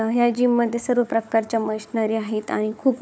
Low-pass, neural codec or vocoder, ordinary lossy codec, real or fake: none; codec, 16 kHz, 4 kbps, FunCodec, trained on Chinese and English, 50 frames a second; none; fake